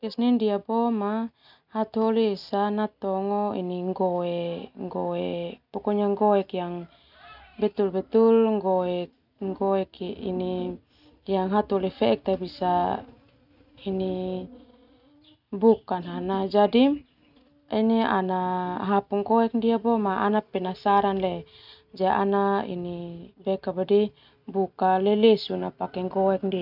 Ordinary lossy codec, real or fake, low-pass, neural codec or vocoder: none; real; 5.4 kHz; none